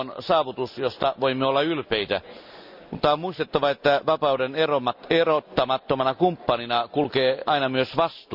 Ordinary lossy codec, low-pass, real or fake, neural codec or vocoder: none; 5.4 kHz; real; none